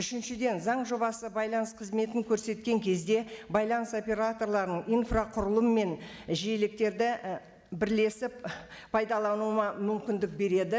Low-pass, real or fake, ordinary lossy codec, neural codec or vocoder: none; real; none; none